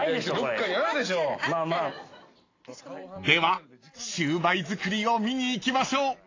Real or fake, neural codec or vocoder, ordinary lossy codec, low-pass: real; none; AAC, 32 kbps; 7.2 kHz